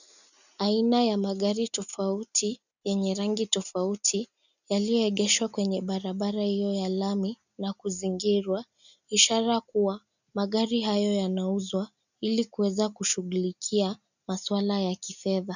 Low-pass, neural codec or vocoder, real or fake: 7.2 kHz; none; real